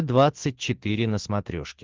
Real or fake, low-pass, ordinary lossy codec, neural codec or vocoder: real; 7.2 kHz; Opus, 16 kbps; none